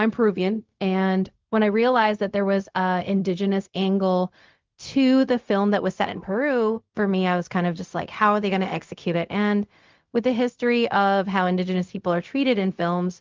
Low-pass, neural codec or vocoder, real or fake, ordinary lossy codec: 7.2 kHz; codec, 16 kHz, 0.4 kbps, LongCat-Audio-Codec; fake; Opus, 32 kbps